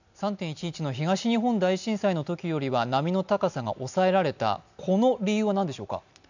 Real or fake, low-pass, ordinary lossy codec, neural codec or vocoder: real; 7.2 kHz; none; none